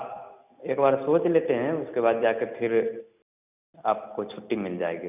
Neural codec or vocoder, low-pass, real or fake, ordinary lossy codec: none; 3.6 kHz; real; none